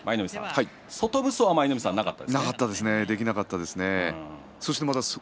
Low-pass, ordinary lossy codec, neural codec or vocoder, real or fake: none; none; none; real